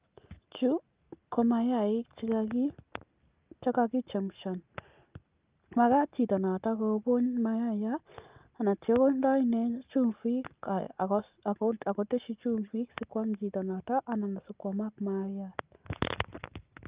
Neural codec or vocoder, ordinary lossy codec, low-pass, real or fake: none; Opus, 24 kbps; 3.6 kHz; real